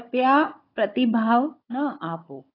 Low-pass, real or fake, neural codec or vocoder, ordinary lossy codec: 5.4 kHz; fake; codec, 16 kHz, 16 kbps, FunCodec, trained on Chinese and English, 50 frames a second; AAC, 24 kbps